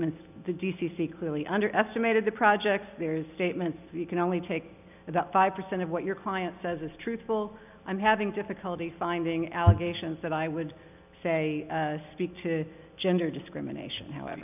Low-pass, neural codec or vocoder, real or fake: 3.6 kHz; none; real